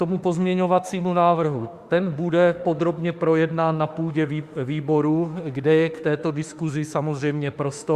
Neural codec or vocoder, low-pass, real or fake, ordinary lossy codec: autoencoder, 48 kHz, 32 numbers a frame, DAC-VAE, trained on Japanese speech; 14.4 kHz; fake; Opus, 64 kbps